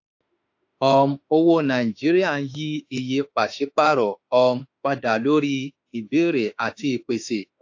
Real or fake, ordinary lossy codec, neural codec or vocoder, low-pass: fake; AAC, 48 kbps; autoencoder, 48 kHz, 32 numbers a frame, DAC-VAE, trained on Japanese speech; 7.2 kHz